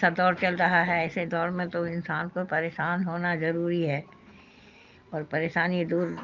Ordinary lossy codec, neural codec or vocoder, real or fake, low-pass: Opus, 24 kbps; none; real; 7.2 kHz